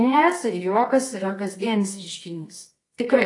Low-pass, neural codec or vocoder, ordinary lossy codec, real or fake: 10.8 kHz; codec, 24 kHz, 0.9 kbps, WavTokenizer, medium music audio release; AAC, 48 kbps; fake